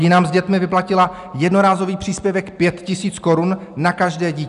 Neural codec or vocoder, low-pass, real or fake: none; 10.8 kHz; real